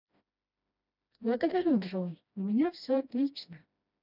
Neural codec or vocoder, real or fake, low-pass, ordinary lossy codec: codec, 16 kHz, 1 kbps, FreqCodec, smaller model; fake; 5.4 kHz; none